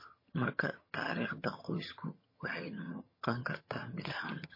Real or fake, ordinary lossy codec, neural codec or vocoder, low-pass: fake; MP3, 24 kbps; vocoder, 22.05 kHz, 80 mel bands, HiFi-GAN; 5.4 kHz